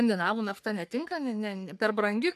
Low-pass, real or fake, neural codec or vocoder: 14.4 kHz; fake; codec, 44.1 kHz, 3.4 kbps, Pupu-Codec